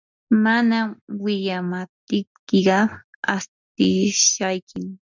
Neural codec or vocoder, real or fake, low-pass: none; real; 7.2 kHz